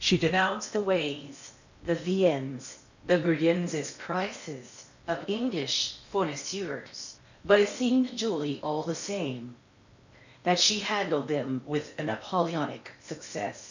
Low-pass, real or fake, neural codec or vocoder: 7.2 kHz; fake; codec, 16 kHz in and 24 kHz out, 0.8 kbps, FocalCodec, streaming, 65536 codes